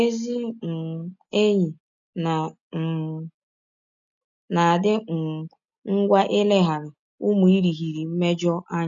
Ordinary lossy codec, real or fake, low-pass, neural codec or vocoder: none; real; 7.2 kHz; none